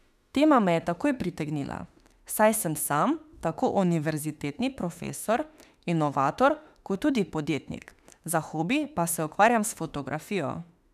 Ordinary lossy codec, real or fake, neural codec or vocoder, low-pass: none; fake; autoencoder, 48 kHz, 32 numbers a frame, DAC-VAE, trained on Japanese speech; 14.4 kHz